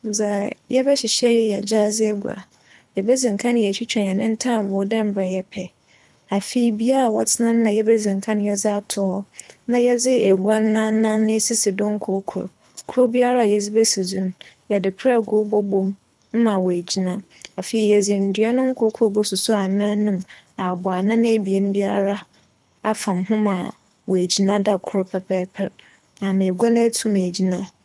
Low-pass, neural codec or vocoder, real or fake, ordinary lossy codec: none; codec, 24 kHz, 3 kbps, HILCodec; fake; none